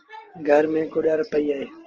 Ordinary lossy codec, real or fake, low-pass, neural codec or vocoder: Opus, 16 kbps; real; 7.2 kHz; none